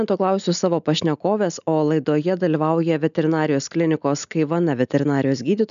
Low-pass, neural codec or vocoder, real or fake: 7.2 kHz; none; real